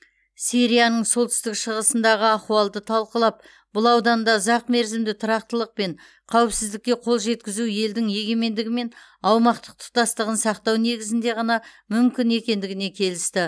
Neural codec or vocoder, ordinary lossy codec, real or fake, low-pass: none; none; real; none